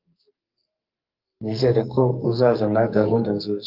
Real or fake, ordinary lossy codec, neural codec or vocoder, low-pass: fake; Opus, 24 kbps; codec, 44.1 kHz, 2.6 kbps, SNAC; 5.4 kHz